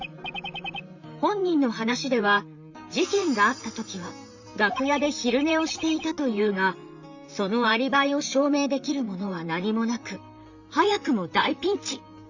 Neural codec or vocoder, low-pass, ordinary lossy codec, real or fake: vocoder, 44.1 kHz, 128 mel bands, Pupu-Vocoder; 7.2 kHz; Opus, 64 kbps; fake